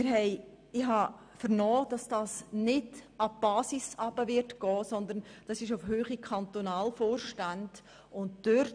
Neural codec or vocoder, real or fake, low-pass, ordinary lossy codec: none; real; 9.9 kHz; none